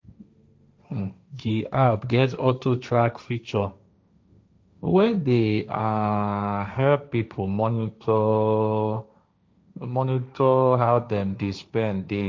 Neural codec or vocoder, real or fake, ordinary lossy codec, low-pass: codec, 16 kHz, 1.1 kbps, Voila-Tokenizer; fake; none; none